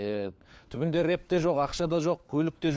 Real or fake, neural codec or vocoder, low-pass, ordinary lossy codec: fake; codec, 16 kHz, 4 kbps, FunCodec, trained on LibriTTS, 50 frames a second; none; none